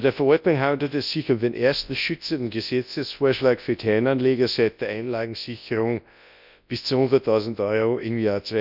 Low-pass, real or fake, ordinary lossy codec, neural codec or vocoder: 5.4 kHz; fake; none; codec, 24 kHz, 0.9 kbps, WavTokenizer, large speech release